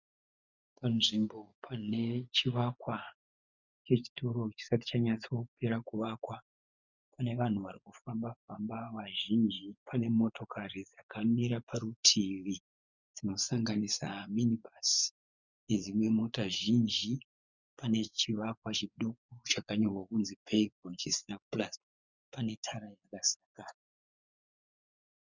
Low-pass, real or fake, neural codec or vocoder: 7.2 kHz; fake; vocoder, 24 kHz, 100 mel bands, Vocos